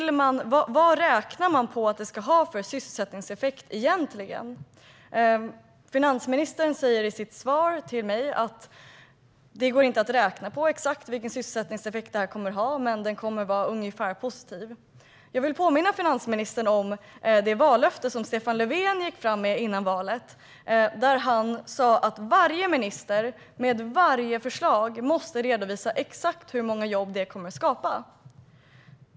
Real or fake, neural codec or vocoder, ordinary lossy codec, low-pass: real; none; none; none